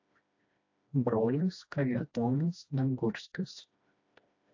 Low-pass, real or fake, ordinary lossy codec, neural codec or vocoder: 7.2 kHz; fake; AAC, 48 kbps; codec, 16 kHz, 1 kbps, FreqCodec, smaller model